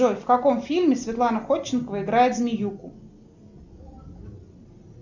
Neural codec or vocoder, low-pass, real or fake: none; 7.2 kHz; real